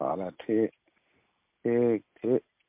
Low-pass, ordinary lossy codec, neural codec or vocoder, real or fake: 3.6 kHz; MP3, 32 kbps; none; real